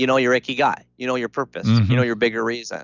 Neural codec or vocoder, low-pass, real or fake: none; 7.2 kHz; real